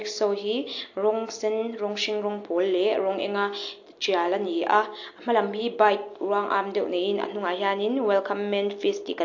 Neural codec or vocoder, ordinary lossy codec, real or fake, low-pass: none; AAC, 48 kbps; real; 7.2 kHz